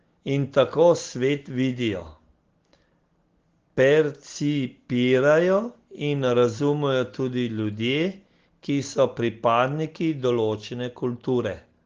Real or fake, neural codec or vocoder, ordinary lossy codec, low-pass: real; none; Opus, 16 kbps; 7.2 kHz